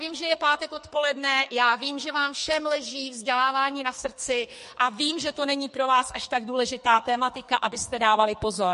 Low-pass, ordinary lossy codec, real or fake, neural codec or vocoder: 14.4 kHz; MP3, 48 kbps; fake; codec, 44.1 kHz, 2.6 kbps, SNAC